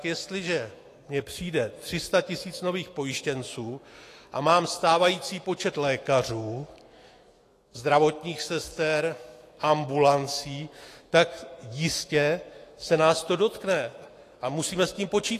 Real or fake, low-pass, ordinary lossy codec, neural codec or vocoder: fake; 14.4 kHz; AAC, 48 kbps; autoencoder, 48 kHz, 128 numbers a frame, DAC-VAE, trained on Japanese speech